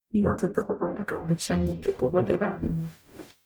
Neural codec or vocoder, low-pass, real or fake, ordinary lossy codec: codec, 44.1 kHz, 0.9 kbps, DAC; none; fake; none